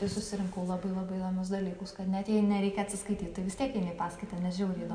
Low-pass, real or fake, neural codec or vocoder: 9.9 kHz; real; none